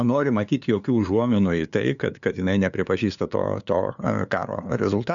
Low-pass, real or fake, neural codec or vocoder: 7.2 kHz; fake; codec, 16 kHz, 2 kbps, FunCodec, trained on LibriTTS, 25 frames a second